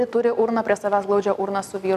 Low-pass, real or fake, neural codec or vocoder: 14.4 kHz; real; none